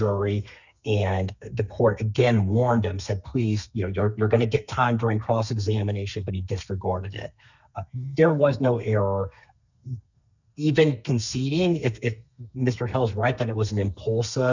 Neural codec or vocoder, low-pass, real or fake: codec, 32 kHz, 1.9 kbps, SNAC; 7.2 kHz; fake